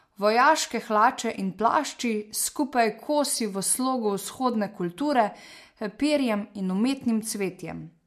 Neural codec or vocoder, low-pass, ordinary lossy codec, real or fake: none; 14.4 kHz; MP3, 64 kbps; real